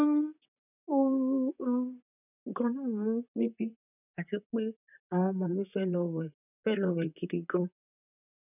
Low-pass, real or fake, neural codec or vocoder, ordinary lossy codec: 3.6 kHz; fake; vocoder, 44.1 kHz, 128 mel bands, Pupu-Vocoder; none